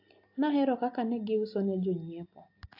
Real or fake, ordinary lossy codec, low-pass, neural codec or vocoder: real; AAC, 32 kbps; 5.4 kHz; none